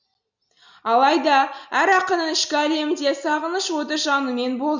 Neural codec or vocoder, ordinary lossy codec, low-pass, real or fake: none; none; 7.2 kHz; real